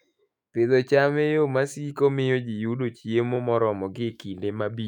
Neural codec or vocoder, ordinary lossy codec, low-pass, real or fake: autoencoder, 48 kHz, 128 numbers a frame, DAC-VAE, trained on Japanese speech; none; 19.8 kHz; fake